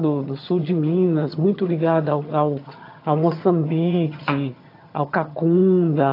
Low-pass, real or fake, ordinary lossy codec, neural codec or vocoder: 5.4 kHz; fake; AAC, 32 kbps; vocoder, 22.05 kHz, 80 mel bands, HiFi-GAN